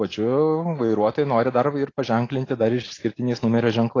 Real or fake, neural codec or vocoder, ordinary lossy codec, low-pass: real; none; AAC, 32 kbps; 7.2 kHz